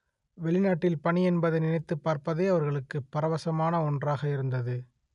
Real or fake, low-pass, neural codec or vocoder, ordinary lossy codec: real; 10.8 kHz; none; none